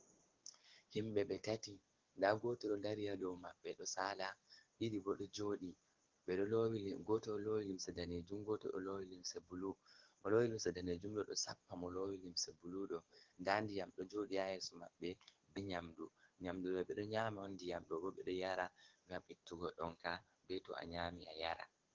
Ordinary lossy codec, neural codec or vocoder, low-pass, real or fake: Opus, 16 kbps; codec, 44.1 kHz, 7.8 kbps, DAC; 7.2 kHz; fake